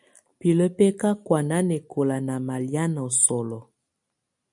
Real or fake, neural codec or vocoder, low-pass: real; none; 10.8 kHz